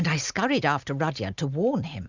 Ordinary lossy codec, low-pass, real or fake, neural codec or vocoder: Opus, 64 kbps; 7.2 kHz; real; none